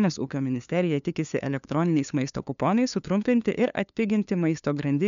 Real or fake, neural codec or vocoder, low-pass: fake; codec, 16 kHz, 2 kbps, FunCodec, trained on LibriTTS, 25 frames a second; 7.2 kHz